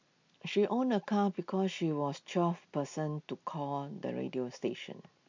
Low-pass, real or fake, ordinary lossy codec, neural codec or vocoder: 7.2 kHz; real; MP3, 48 kbps; none